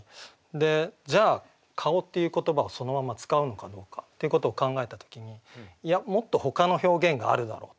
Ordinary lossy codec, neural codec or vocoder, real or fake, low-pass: none; none; real; none